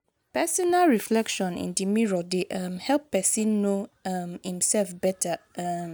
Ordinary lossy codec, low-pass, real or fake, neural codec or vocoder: none; none; real; none